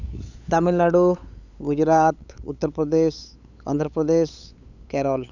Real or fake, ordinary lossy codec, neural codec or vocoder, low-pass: fake; none; codec, 16 kHz, 8 kbps, FunCodec, trained on LibriTTS, 25 frames a second; 7.2 kHz